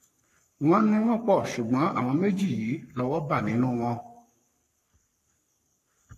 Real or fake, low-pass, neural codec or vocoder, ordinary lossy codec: fake; 14.4 kHz; codec, 44.1 kHz, 3.4 kbps, Pupu-Codec; AAC, 64 kbps